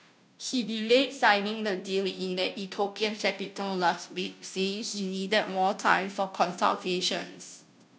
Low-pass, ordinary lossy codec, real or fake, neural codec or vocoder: none; none; fake; codec, 16 kHz, 0.5 kbps, FunCodec, trained on Chinese and English, 25 frames a second